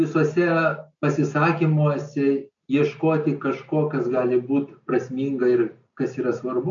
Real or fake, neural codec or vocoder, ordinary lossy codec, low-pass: real; none; MP3, 48 kbps; 7.2 kHz